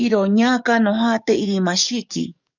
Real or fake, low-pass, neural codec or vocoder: fake; 7.2 kHz; codec, 44.1 kHz, 7.8 kbps, DAC